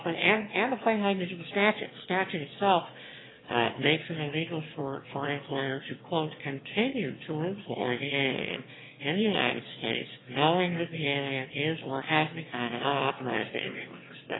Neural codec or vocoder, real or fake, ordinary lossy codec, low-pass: autoencoder, 22.05 kHz, a latent of 192 numbers a frame, VITS, trained on one speaker; fake; AAC, 16 kbps; 7.2 kHz